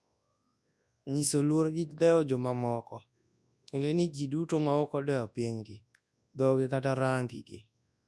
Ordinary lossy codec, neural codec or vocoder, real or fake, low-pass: none; codec, 24 kHz, 0.9 kbps, WavTokenizer, large speech release; fake; none